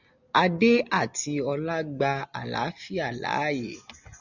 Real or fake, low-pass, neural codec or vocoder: real; 7.2 kHz; none